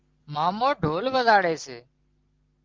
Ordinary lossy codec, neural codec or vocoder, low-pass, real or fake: Opus, 16 kbps; none; 7.2 kHz; real